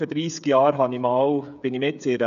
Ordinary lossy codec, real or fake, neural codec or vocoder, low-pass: none; fake; codec, 16 kHz, 8 kbps, FreqCodec, smaller model; 7.2 kHz